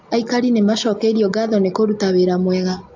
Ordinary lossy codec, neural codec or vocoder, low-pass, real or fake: AAC, 48 kbps; none; 7.2 kHz; real